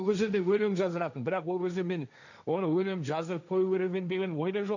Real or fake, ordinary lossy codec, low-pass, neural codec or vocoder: fake; none; 7.2 kHz; codec, 16 kHz, 1.1 kbps, Voila-Tokenizer